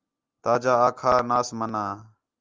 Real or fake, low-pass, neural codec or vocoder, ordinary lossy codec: real; 7.2 kHz; none; Opus, 24 kbps